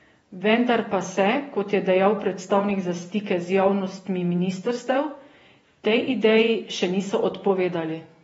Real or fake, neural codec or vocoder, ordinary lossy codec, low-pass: fake; vocoder, 48 kHz, 128 mel bands, Vocos; AAC, 24 kbps; 19.8 kHz